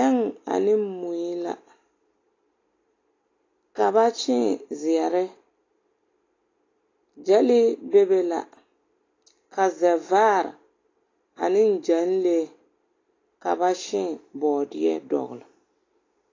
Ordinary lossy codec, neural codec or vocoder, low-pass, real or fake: AAC, 32 kbps; none; 7.2 kHz; real